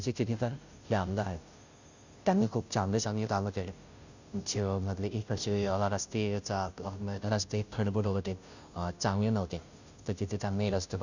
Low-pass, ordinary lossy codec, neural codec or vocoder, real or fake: 7.2 kHz; none; codec, 16 kHz, 0.5 kbps, FunCodec, trained on Chinese and English, 25 frames a second; fake